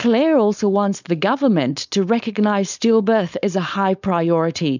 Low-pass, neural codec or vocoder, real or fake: 7.2 kHz; codec, 16 kHz, 4.8 kbps, FACodec; fake